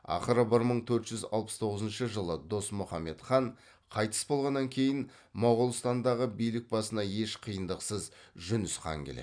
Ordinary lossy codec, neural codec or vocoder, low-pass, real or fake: none; none; 9.9 kHz; real